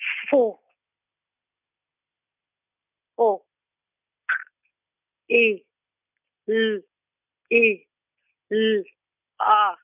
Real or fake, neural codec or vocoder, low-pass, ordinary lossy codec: real; none; 3.6 kHz; none